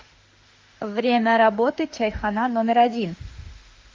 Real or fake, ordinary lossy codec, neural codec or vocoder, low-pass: fake; Opus, 24 kbps; autoencoder, 48 kHz, 32 numbers a frame, DAC-VAE, trained on Japanese speech; 7.2 kHz